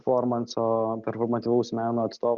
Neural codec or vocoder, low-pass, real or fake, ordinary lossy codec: none; 7.2 kHz; real; Opus, 64 kbps